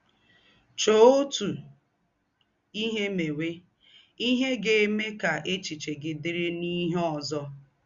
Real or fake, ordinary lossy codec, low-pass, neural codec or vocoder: real; Opus, 64 kbps; 7.2 kHz; none